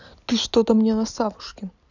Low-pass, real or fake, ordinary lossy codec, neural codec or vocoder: 7.2 kHz; real; none; none